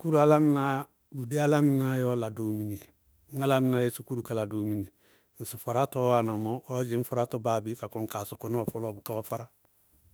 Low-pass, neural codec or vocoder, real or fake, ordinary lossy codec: none; autoencoder, 48 kHz, 32 numbers a frame, DAC-VAE, trained on Japanese speech; fake; none